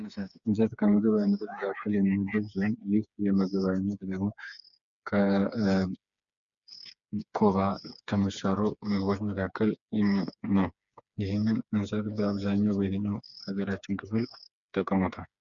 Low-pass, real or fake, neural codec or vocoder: 7.2 kHz; fake; codec, 16 kHz, 4 kbps, FreqCodec, smaller model